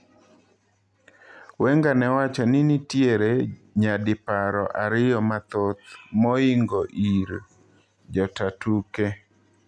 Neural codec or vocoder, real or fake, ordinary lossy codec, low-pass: none; real; none; none